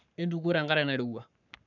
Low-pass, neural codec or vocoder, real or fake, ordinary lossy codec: 7.2 kHz; codec, 44.1 kHz, 7.8 kbps, Pupu-Codec; fake; none